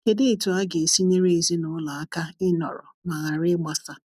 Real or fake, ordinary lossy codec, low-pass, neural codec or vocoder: real; none; 14.4 kHz; none